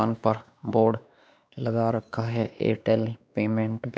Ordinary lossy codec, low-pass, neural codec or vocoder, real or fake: none; none; codec, 16 kHz, 2 kbps, X-Codec, WavLM features, trained on Multilingual LibriSpeech; fake